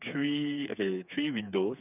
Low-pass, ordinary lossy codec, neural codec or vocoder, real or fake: 3.6 kHz; none; codec, 16 kHz, 4 kbps, FreqCodec, smaller model; fake